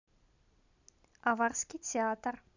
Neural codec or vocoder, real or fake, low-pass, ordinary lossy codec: none; real; 7.2 kHz; none